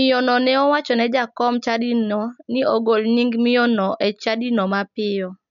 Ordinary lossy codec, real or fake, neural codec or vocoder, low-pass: none; real; none; 7.2 kHz